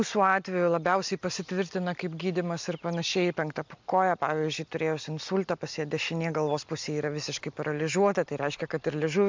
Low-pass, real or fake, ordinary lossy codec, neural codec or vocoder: 7.2 kHz; real; MP3, 64 kbps; none